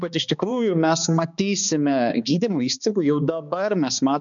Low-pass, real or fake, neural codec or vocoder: 7.2 kHz; fake; codec, 16 kHz, 2 kbps, X-Codec, HuBERT features, trained on balanced general audio